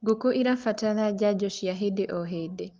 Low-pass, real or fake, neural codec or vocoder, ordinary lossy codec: 7.2 kHz; real; none; Opus, 32 kbps